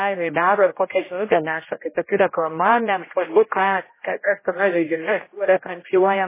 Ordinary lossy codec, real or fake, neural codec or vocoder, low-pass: MP3, 16 kbps; fake; codec, 16 kHz, 0.5 kbps, X-Codec, HuBERT features, trained on general audio; 3.6 kHz